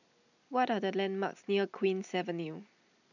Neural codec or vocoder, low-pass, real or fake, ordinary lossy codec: none; 7.2 kHz; real; none